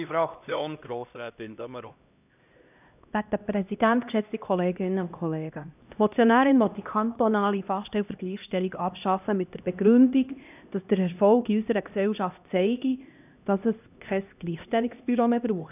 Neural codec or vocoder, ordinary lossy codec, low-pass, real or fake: codec, 16 kHz, 1 kbps, X-Codec, HuBERT features, trained on LibriSpeech; none; 3.6 kHz; fake